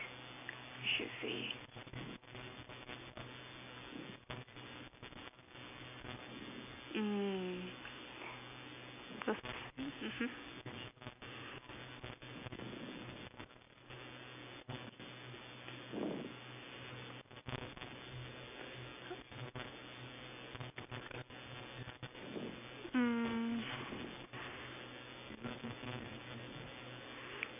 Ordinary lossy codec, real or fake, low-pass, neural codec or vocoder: none; real; 3.6 kHz; none